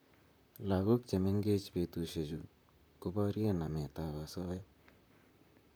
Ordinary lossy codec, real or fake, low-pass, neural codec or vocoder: none; fake; none; vocoder, 44.1 kHz, 128 mel bands, Pupu-Vocoder